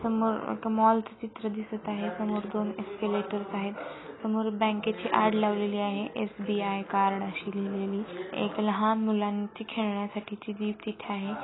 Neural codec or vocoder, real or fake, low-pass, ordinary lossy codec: none; real; 7.2 kHz; AAC, 16 kbps